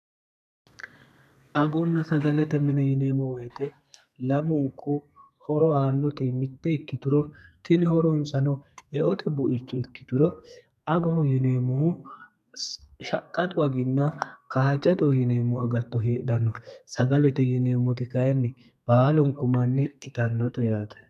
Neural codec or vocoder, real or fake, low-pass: codec, 32 kHz, 1.9 kbps, SNAC; fake; 14.4 kHz